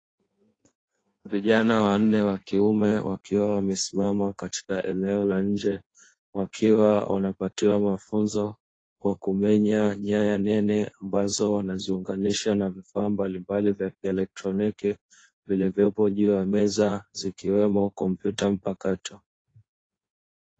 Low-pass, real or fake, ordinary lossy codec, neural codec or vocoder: 9.9 kHz; fake; AAC, 32 kbps; codec, 16 kHz in and 24 kHz out, 1.1 kbps, FireRedTTS-2 codec